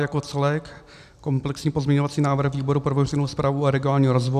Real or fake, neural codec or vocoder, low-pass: real; none; 14.4 kHz